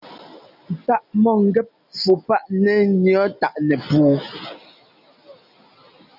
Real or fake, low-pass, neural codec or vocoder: real; 5.4 kHz; none